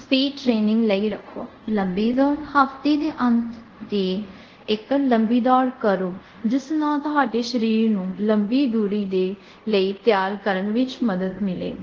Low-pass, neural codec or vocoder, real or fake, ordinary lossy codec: 7.2 kHz; codec, 24 kHz, 0.5 kbps, DualCodec; fake; Opus, 16 kbps